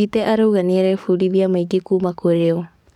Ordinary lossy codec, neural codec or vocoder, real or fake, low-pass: none; codec, 44.1 kHz, 7.8 kbps, DAC; fake; 19.8 kHz